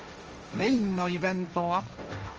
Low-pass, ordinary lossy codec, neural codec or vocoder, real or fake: 7.2 kHz; Opus, 24 kbps; codec, 16 kHz, 1.1 kbps, Voila-Tokenizer; fake